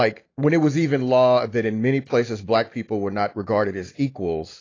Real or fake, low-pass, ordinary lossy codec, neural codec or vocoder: real; 7.2 kHz; AAC, 32 kbps; none